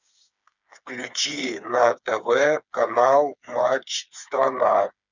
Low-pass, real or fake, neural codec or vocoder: 7.2 kHz; fake; codec, 16 kHz, 4 kbps, FreqCodec, smaller model